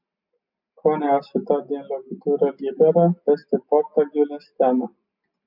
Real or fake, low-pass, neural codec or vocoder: real; 5.4 kHz; none